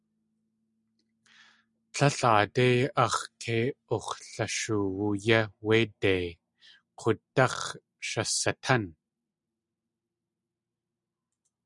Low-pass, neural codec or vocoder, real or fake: 10.8 kHz; none; real